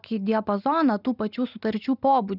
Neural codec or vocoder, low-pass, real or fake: none; 5.4 kHz; real